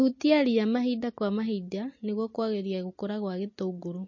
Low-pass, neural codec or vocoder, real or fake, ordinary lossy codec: 7.2 kHz; none; real; MP3, 32 kbps